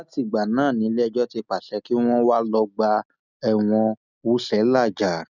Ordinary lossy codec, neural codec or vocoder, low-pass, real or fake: none; none; 7.2 kHz; real